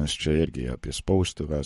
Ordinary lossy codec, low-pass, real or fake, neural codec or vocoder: MP3, 48 kbps; 19.8 kHz; fake; codec, 44.1 kHz, 7.8 kbps, Pupu-Codec